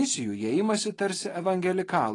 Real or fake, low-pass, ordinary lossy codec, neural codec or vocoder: real; 10.8 kHz; AAC, 32 kbps; none